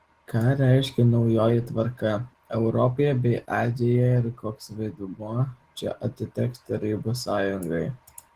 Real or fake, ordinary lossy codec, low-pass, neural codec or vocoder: real; Opus, 16 kbps; 14.4 kHz; none